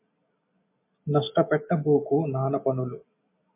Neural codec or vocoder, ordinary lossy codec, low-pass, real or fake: none; MP3, 24 kbps; 3.6 kHz; real